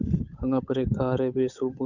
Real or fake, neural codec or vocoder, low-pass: fake; codec, 16 kHz, 8 kbps, FunCodec, trained on Chinese and English, 25 frames a second; 7.2 kHz